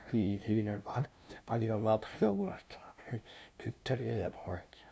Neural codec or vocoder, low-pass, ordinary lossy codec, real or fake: codec, 16 kHz, 0.5 kbps, FunCodec, trained on LibriTTS, 25 frames a second; none; none; fake